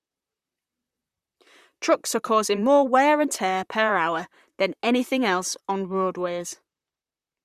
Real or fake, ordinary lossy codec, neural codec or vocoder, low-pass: fake; Opus, 64 kbps; vocoder, 44.1 kHz, 128 mel bands, Pupu-Vocoder; 14.4 kHz